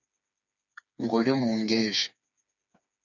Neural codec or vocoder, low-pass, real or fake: codec, 16 kHz, 4 kbps, FreqCodec, smaller model; 7.2 kHz; fake